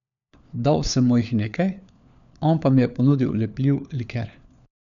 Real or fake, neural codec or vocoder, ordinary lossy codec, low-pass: fake; codec, 16 kHz, 4 kbps, FunCodec, trained on LibriTTS, 50 frames a second; none; 7.2 kHz